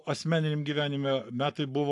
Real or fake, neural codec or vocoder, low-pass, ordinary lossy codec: fake; codec, 44.1 kHz, 7.8 kbps, Pupu-Codec; 10.8 kHz; AAC, 64 kbps